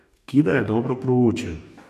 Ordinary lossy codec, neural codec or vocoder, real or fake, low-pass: none; codec, 44.1 kHz, 2.6 kbps, DAC; fake; 14.4 kHz